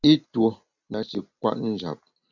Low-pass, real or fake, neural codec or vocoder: 7.2 kHz; real; none